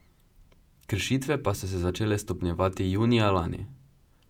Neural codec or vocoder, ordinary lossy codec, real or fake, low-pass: vocoder, 48 kHz, 128 mel bands, Vocos; none; fake; 19.8 kHz